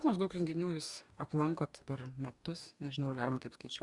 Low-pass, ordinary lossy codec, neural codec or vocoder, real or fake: 10.8 kHz; Opus, 64 kbps; codec, 44.1 kHz, 2.6 kbps, DAC; fake